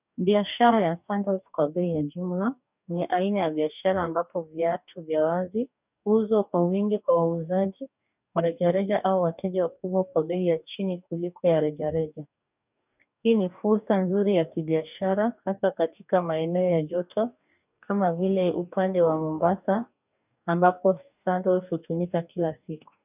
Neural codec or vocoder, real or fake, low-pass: codec, 44.1 kHz, 2.6 kbps, DAC; fake; 3.6 kHz